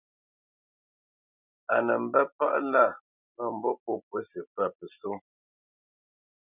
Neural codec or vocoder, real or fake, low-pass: none; real; 3.6 kHz